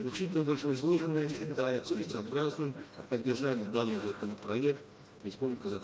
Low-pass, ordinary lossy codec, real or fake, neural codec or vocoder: none; none; fake; codec, 16 kHz, 1 kbps, FreqCodec, smaller model